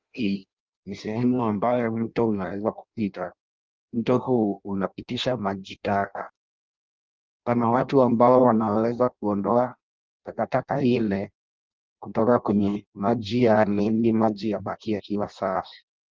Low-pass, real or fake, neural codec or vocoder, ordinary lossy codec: 7.2 kHz; fake; codec, 16 kHz in and 24 kHz out, 0.6 kbps, FireRedTTS-2 codec; Opus, 32 kbps